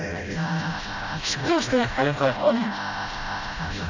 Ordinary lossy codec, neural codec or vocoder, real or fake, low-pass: AAC, 48 kbps; codec, 16 kHz, 0.5 kbps, FreqCodec, smaller model; fake; 7.2 kHz